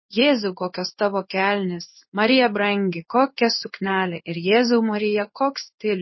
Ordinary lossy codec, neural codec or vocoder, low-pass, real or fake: MP3, 24 kbps; codec, 16 kHz in and 24 kHz out, 1 kbps, XY-Tokenizer; 7.2 kHz; fake